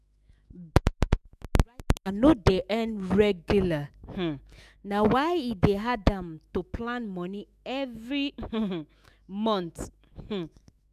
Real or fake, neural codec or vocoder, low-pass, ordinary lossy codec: fake; autoencoder, 48 kHz, 128 numbers a frame, DAC-VAE, trained on Japanese speech; 14.4 kHz; none